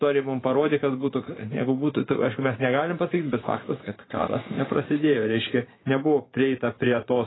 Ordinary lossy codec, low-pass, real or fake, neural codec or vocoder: AAC, 16 kbps; 7.2 kHz; fake; vocoder, 24 kHz, 100 mel bands, Vocos